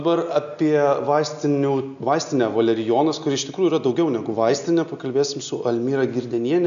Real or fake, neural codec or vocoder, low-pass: real; none; 7.2 kHz